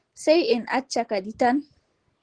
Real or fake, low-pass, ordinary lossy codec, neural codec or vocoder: real; 9.9 kHz; Opus, 16 kbps; none